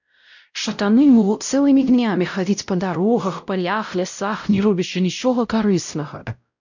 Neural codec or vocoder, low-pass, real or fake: codec, 16 kHz, 0.5 kbps, X-Codec, WavLM features, trained on Multilingual LibriSpeech; 7.2 kHz; fake